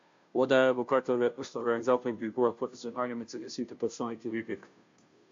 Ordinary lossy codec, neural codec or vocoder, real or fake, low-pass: AAC, 48 kbps; codec, 16 kHz, 0.5 kbps, FunCodec, trained on Chinese and English, 25 frames a second; fake; 7.2 kHz